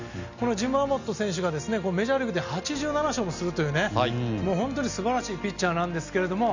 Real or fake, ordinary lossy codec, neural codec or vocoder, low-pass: real; none; none; 7.2 kHz